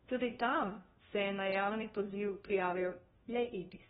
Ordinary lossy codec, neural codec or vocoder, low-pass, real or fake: AAC, 16 kbps; codec, 16 kHz, 1 kbps, FunCodec, trained on LibriTTS, 50 frames a second; 7.2 kHz; fake